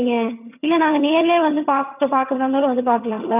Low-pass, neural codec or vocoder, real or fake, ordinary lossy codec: 3.6 kHz; vocoder, 22.05 kHz, 80 mel bands, HiFi-GAN; fake; none